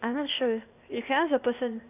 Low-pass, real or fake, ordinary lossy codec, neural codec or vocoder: 3.6 kHz; fake; none; codec, 16 kHz, 0.8 kbps, ZipCodec